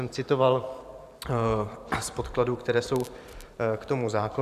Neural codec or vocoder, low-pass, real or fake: vocoder, 44.1 kHz, 128 mel bands every 512 samples, BigVGAN v2; 14.4 kHz; fake